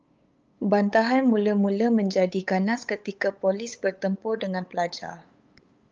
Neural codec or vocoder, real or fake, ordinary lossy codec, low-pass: codec, 16 kHz, 8 kbps, FunCodec, trained on LibriTTS, 25 frames a second; fake; Opus, 24 kbps; 7.2 kHz